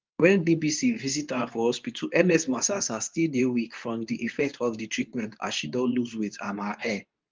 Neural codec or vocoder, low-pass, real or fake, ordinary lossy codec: codec, 24 kHz, 0.9 kbps, WavTokenizer, medium speech release version 2; 7.2 kHz; fake; Opus, 24 kbps